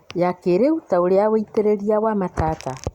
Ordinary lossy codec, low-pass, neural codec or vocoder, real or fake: Opus, 64 kbps; 19.8 kHz; none; real